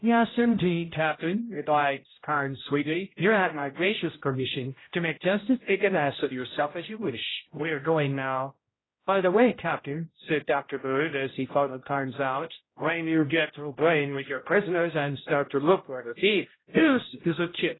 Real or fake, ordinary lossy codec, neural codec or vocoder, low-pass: fake; AAC, 16 kbps; codec, 16 kHz, 0.5 kbps, X-Codec, HuBERT features, trained on general audio; 7.2 kHz